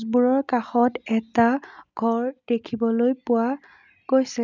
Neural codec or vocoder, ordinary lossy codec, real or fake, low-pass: none; none; real; 7.2 kHz